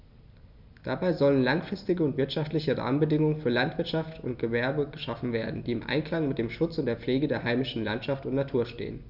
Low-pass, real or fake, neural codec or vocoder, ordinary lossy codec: 5.4 kHz; real; none; none